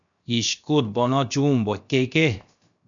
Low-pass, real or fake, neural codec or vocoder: 7.2 kHz; fake; codec, 16 kHz, 0.7 kbps, FocalCodec